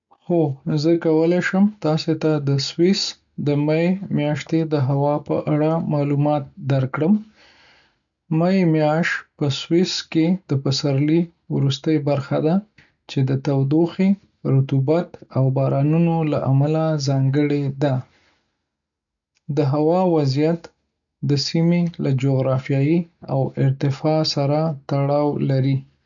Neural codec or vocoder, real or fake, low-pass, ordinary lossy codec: none; real; 7.2 kHz; none